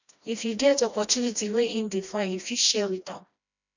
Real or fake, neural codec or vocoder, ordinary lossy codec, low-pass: fake; codec, 16 kHz, 1 kbps, FreqCodec, smaller model; none; 7.2 kHz